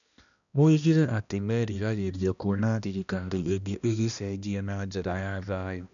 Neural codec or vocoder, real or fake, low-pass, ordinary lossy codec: codec, 16 kHz, 1 kbps, X-Codec, HuBERT features, trained on balanced general audio; fake; 7.2 kHz; none